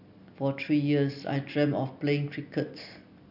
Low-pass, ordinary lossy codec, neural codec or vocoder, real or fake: 5.4 kHz; none; none; real